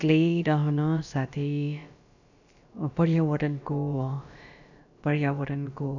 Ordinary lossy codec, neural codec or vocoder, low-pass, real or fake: none; codec, 16 kHz, about 1 kbps, DyCAST, with the encoder's durations; 7.2 kHz; fake